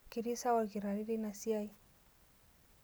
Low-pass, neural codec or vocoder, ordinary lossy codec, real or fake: none; none; none; real